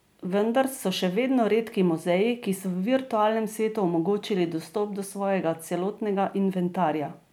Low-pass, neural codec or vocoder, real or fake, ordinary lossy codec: none; none; real; none